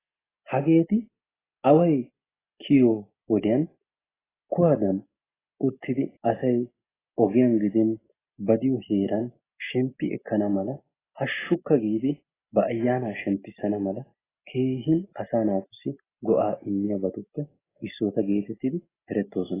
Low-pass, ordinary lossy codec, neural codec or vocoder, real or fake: 3.6 kHz; AAC, 16 kbps; none; real